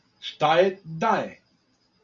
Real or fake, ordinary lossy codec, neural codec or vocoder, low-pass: real; MP3, 96 kbps; none; 7.2 kHz